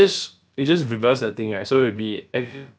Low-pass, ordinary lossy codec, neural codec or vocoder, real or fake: none; none; codec, 16 kHz, about 1 kbps, DyCAST, with the encoder's durations; fake